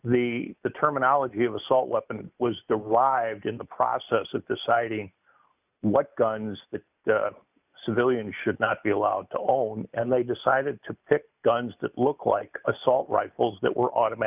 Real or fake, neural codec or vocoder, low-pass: real; none; 3.6 kHz